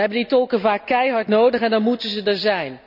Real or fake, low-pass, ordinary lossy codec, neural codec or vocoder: real; 5.4 kHz; none; none